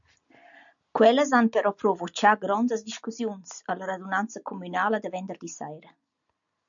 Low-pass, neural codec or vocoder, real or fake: 7.2 kHz; none; real